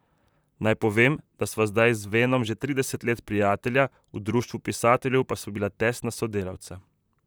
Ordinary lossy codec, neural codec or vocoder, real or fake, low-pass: none; vocoder, 44.1 kHz, 128 mel bands, Pupu-Vocoder; fake; none